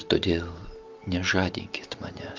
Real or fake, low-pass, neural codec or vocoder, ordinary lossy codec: real; 7.2 kHz; none; Opus, 24 kbps